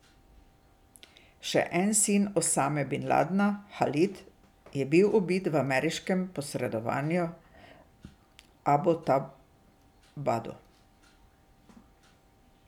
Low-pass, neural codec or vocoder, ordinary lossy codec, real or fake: 19.8 kHz; none; none; real